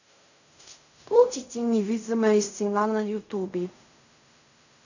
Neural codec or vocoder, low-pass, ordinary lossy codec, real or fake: codec, 16 kHz in and 24 kHz out, 0.4 kbps, LongCat-Audio-Codec, fine tuned four codebook decoder; 7.2 kHz; none; fake